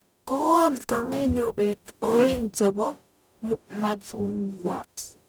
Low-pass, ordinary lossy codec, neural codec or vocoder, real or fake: none; none; codec, 44.1 kHz, 0.9 kbps, DAC; fake